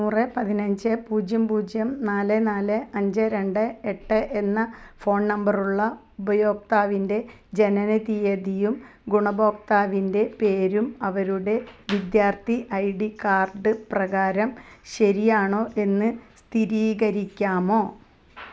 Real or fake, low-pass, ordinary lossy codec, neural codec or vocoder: real; none; none; none